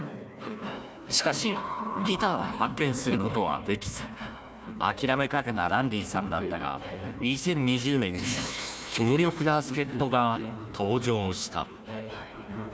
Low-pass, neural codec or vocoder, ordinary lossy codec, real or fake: none; codec, 16 kHz, 1 kbps, FunCodec, trained on Chinese and English, 50 frames a second; none; fake